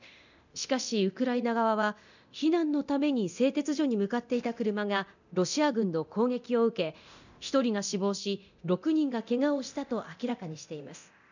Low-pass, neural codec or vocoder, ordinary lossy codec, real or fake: 7.2 kHz; codec, 24 kHz, 0.9 kbps, DualCodec; none; fake